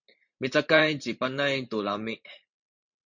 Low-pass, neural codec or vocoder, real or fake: 7.2 kHz; vocoder, 44.1 kHz, 128 mel bands every 512 samples, BigVGAN v2; fake